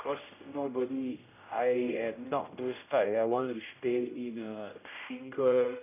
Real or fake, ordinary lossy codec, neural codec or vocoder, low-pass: fake; none; codec, 16 kHz, 0.5 kbps, X-Codec, HuBERT features, trained on general audio; 3.6 kHz